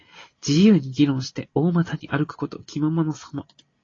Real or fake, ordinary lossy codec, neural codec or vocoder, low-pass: real; AAC, 32 kbps; none; 7.2 kHz